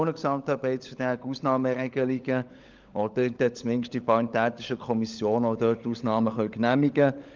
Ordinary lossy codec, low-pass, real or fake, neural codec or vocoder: Opus, 32 kbps; 7.2 kHz; fake; codec, 16 kHz, 16 kbps, FunCodec, trained on LibriTTS, 50 frames a second